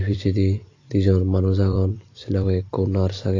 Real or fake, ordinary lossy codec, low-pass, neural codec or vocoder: fake; AAC, 32 kbps; 7.2 kHz; vocoder, 44.1 kHz, 128 mel bands every 256 samples, BigVGAN v2